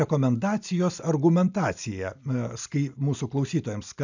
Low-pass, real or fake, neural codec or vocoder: 7.2 kHz; real; none